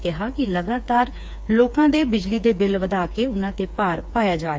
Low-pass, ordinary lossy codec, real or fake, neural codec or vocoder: none; none; fake; codec, 16 kHz, 4 kbps, FreqCodec, smaller model